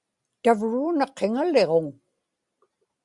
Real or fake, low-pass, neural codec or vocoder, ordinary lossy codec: real; 10.8 kHz; none; Opus, 64 kbps